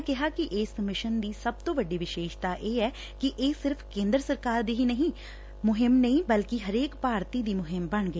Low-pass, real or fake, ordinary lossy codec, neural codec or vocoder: none; real; none; none